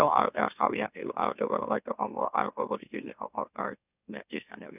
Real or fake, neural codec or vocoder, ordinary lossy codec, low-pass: fake; autoencoder, 44.1 kHz, a latent of 192 numbers a frame, MeloTTS; none; 3.6 kHz